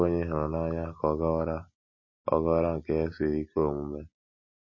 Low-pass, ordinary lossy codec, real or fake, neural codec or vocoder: 7.2 kHz; MP3, 32 kbps; real; none